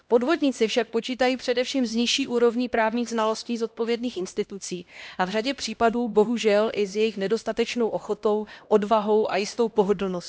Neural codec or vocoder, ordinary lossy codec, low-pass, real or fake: codec, 16 kHz, 1 kbps, X-Codec, HuBERT features, trained on LibriSpeech; none; none; fake